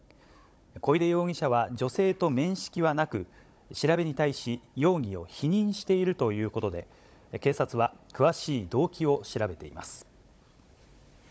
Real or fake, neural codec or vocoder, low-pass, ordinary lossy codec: fake; codec, 16 kHz, 16 kbps, FunCodec, trained on Chinese and English, 50 frames a second; none; none